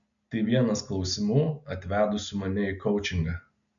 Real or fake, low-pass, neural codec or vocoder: real; 7.2 kHz; none